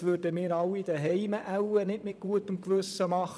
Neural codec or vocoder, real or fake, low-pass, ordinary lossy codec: vocoder, 22.05 kHz, 80 mel bands, Vocos; fake; none; none